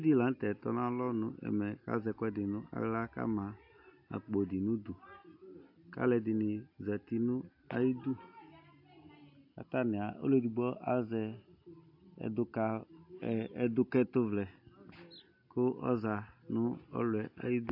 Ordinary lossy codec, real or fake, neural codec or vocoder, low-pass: AAC, 48 kbps; real; none; 5.4 kHz